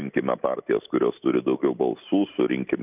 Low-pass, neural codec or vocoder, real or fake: 3.6 kHz; none; real